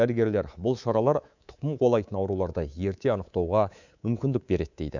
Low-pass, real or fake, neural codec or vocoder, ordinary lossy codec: 7.2 kHz; fake; codec, 24 kHz, 3.1 kbps, DualCodec; none